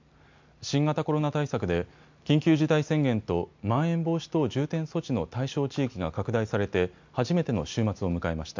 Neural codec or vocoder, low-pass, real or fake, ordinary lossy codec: none; 7.2 kHz; real; MP3, 64 kbps